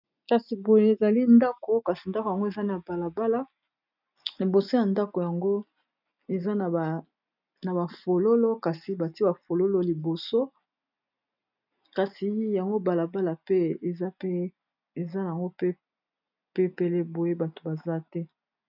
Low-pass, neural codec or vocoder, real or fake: 5.4 kHz; none; real